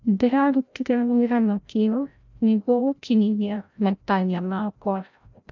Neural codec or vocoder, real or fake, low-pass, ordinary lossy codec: codec, 16 kHz, 0.5 kbps, FreqCodec, larger model; fake; 7.2 kHz; none